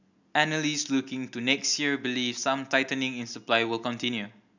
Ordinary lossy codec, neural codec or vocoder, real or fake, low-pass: none; none; real; 7.2 kHz